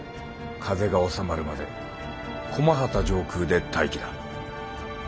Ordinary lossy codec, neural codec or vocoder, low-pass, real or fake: none; none; none; real